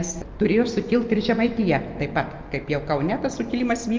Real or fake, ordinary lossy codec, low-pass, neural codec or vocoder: real; Opus, 24 kbps; 7.2 kHz; none